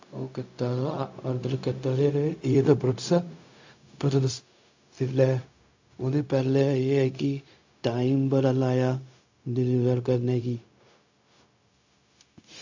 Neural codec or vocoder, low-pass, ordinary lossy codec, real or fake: codec, 16 kHz, 0.4 kbps, LongCat-Audio-Codec; 7.2 kHz; AAC, 48 kbps; fake